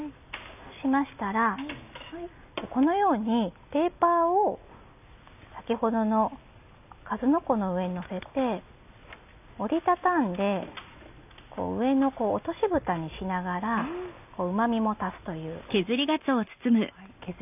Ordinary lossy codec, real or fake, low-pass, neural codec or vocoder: none; real; 3.6 kHz; none